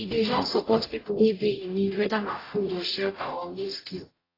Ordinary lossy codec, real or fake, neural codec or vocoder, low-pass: AAC, 24 kbps; fake; codec, 44.1 kHz, 0.9 kbps, DAC; 5.4 kHz